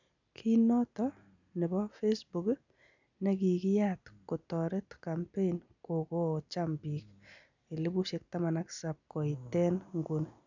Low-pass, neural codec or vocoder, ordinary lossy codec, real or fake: 7.2 kHz; none; none; real